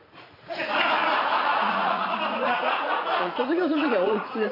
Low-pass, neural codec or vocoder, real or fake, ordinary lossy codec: 5.4 kHz; vocoder, 44.1 kHz, 80 mel bands, Vocos; fake; none